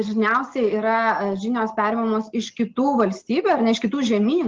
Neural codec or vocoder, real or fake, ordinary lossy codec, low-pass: none; real; Opus, 16 kbps; 7.2 kHz